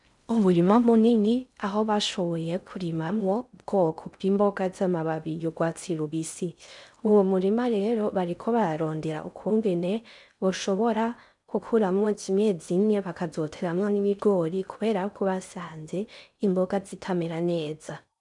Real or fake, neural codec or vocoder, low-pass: fake; codec, 16 kHz in and 24 kHz out, 0.6 kbps, FocalCodec, streaming, 4096 codes; 10.8 kHz